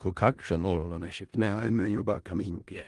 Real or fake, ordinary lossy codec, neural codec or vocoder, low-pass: fake; Opus, 24 kbps; codec, 16 kHz in and 24 kHz out, 0.4 kbps, LongCat-Audio-Codec, four codebook decoder; 10.8 kHz